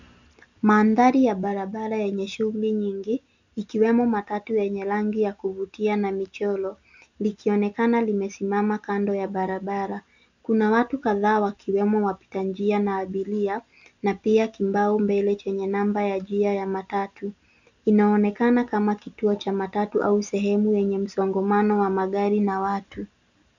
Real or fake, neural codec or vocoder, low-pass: real; none; 7.2 kHz